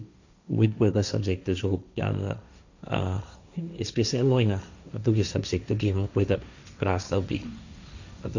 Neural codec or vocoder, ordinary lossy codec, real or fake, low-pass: codec, 16 kHz, 1.1 kbps, Voila-Tokenizer; none; fake; 7.2 kHz